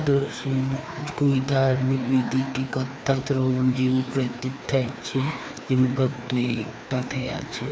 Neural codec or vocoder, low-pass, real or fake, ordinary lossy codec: codec, 16 kHz, 2 kbps, FreqCodec, larger model; none; fake; none